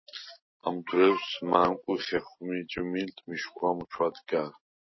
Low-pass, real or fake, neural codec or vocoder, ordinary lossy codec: 7.2 kHz; real; none; MP3, 24 kbps